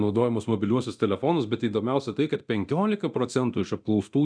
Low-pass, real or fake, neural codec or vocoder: 9.9 kHz; fake; codec, 24 kHz, 0.9 kbps, DualCodec